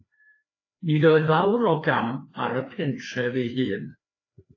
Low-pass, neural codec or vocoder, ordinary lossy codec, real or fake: 7.2 kHz; codec, 16 kHz, 2 kbps, FreqCodec, larger model; AAC, 32 kbps; fake